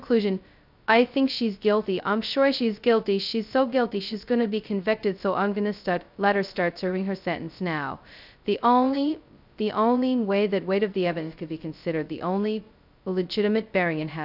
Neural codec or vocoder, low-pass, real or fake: codec, 16 kHz, 0.2 kbps, FocalCodec; 5.4 kHz; fake